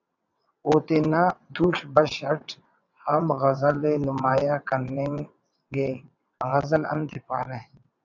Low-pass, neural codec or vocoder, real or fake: 7.2 kHz; vocoder, 22.05 kHz, 80 mel bands, WaveNeXt; fake